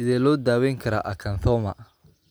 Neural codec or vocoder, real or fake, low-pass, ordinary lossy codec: none; real; none; none